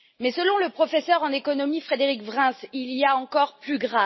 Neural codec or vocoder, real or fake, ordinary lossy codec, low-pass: none; real; MP3, 24 kbps; 7.2 kHz